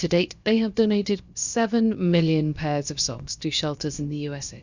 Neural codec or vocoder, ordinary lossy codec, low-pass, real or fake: codec, 16 kHz, about 1 kbps, DyCAST, with the encoder's durations; Opus, 64 kbps; 7.2 kHz; fake